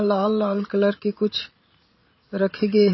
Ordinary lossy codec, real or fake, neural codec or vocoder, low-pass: MP3, 24 kbps; fake; vocoder, 44.1 kHz, 80 mel bands, Vocos; 7.2 kHz